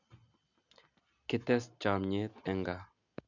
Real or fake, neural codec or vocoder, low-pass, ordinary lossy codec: real; none; 7.2 kHz; none